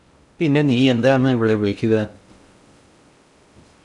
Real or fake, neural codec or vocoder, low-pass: fake; codec, 16 kHz in and 24 kHz out, 0.6 kbps, FocalCodec, streaming, 2048 codes; 10.8 kHz